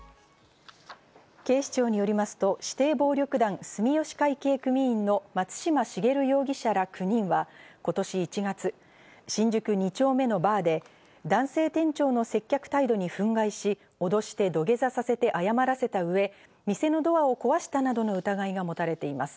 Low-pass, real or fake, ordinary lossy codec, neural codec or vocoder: none; real; none; none